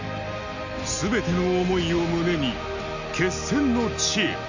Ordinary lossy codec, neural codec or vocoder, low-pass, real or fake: Opus, 64 kbps; none; 7.2 kHz; real